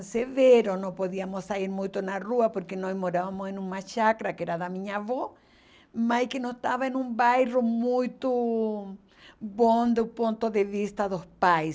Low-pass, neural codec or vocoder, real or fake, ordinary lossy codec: none; none; real; none